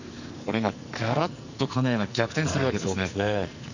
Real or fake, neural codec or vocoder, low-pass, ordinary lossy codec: fake; codec, 44.1 kHz, 2.6 kbps, SNAC; 7.2 kHz; none